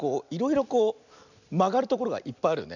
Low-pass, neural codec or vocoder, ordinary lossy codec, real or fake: 7.2 kHz; none; none; real